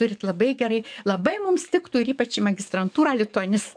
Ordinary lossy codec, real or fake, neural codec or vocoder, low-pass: MP3, 96 kbps; fake; codec, 44.1 kHz, 7.8 kbps, DAC; 9.9 kHz